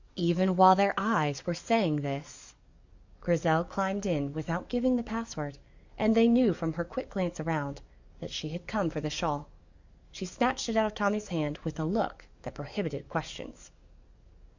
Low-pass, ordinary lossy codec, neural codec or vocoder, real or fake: 7.2 kHz; Opus, 64 kbps; codec, 44.1 kHz, 7.8 kbps, DAC; fake